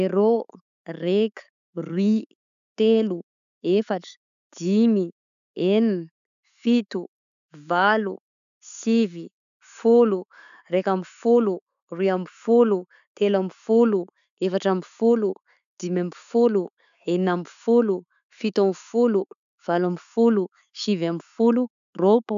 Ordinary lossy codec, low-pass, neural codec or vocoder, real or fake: none; 7.2 kHz; none; real